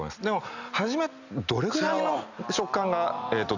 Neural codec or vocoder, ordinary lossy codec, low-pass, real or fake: none; none; 7.2 kHz; real